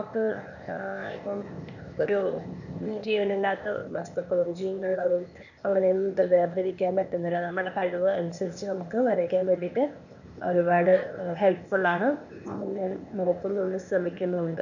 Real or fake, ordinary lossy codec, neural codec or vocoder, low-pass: fake; none; codec, 16 kHz, 0.8 kbps, ZipCodec; 7.2 kHz